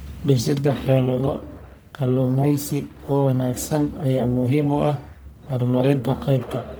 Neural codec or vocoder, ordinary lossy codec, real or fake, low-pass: codec, 44.1 kHz, 1.7 kbps, Pupu-Codec; none; fake; none